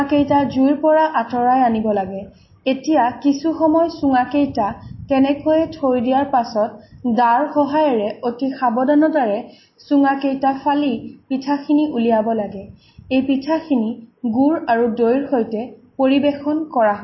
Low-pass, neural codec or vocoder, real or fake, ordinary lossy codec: 7.2 kHz; none; real; MP3, 24 kbps